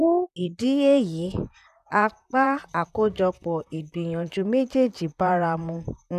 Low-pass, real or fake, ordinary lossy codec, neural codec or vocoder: 14.4 kHz; fake; none; vocoder, 44.1 kHz, 128 mel bands, Pupu-Vocoder